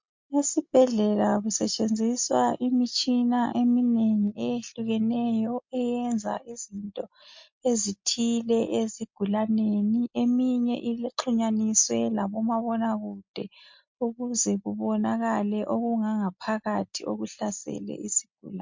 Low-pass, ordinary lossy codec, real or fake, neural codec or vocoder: 7.2 kHz; MP3, 48 kbps; real; none